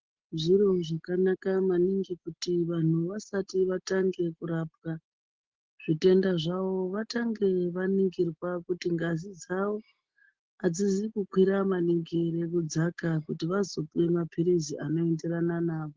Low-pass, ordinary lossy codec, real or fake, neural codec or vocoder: 7.2 kHz; Opus, 16 kbps; real; none